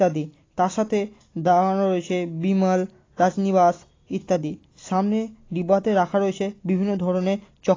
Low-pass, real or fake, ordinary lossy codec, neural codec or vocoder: 7.2 kHz; real; AAC, 32 kbps; none